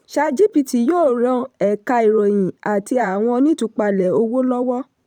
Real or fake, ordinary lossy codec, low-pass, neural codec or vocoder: fake; none; 19.8 kHz; vocoder, 44.1 kHz, 128 mel bands every 512 samples, BigVGAN v2